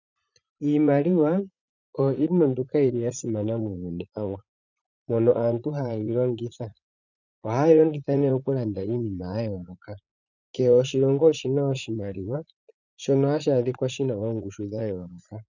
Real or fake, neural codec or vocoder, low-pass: fake; vocoder, 44.1 kHz, 80 mel bands, Vocos; 7.2 kHz